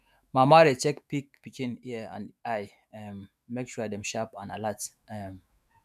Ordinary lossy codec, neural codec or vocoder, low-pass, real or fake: none; autoencoder, 48 kHz, 128 numbers a frame, DAC-VAE, trained on Japanese speech; 14.4 kHz; fake